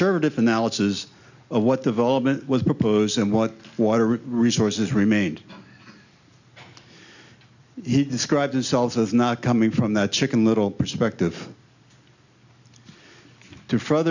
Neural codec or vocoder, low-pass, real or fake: none; 7.2 kHz; real